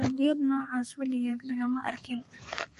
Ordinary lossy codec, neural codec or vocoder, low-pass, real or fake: MP3, 48 kbps; codec, 32 kHz, 1.9 kbps, SNAC; 14.4 kHz; fake